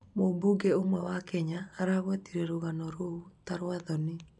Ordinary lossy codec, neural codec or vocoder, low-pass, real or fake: none; none; 10.8 kHz; real